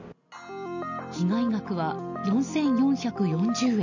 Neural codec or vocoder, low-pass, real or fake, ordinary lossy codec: none; 7.2 kHz; real; none